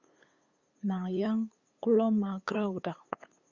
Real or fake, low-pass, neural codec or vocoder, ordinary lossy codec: fake; 7.2 kHz; codec, 16 kHz, 8 kbps, FunCodec, trained on LibriTTS, 25 frames a second; Opus, 64 kbps